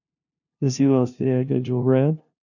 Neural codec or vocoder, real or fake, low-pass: codec, 16 kHz, 0.5 kbps, FunCodec, trained on LibriTTS, 25 frames a second; fake; 7.2 kHz